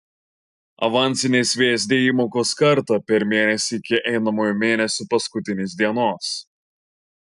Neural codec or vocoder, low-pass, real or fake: none; 10.8 kHz; real